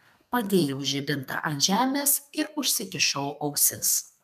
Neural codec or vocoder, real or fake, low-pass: codec, 32 kHz, 1.9 kbps, SNAC; fake; 14.4 kHz